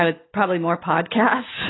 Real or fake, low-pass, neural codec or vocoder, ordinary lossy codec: real; 7.2 kHz; none; AAC, 16 kbps